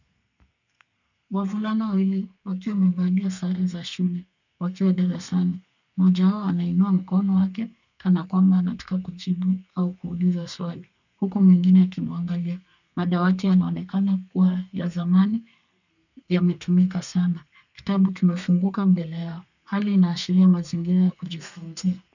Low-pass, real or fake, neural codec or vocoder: 7.2 kHz; fake; codec, 32 kHz, 1.9 kbps, SNAC